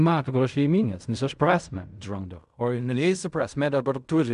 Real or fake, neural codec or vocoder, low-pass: fake; codec, 16 kHz in and 24 kHz out, 0.4 kbps, LongCat-Audio-Codec, fine tuned four codebook decoder; 10.8 kHz